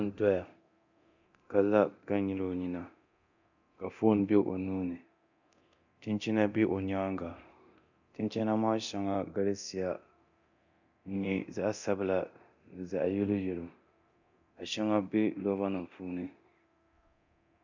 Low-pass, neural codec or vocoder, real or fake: 7.2 kHz; codec, 24 kHz, 0.9 kbps, DualCodec; fake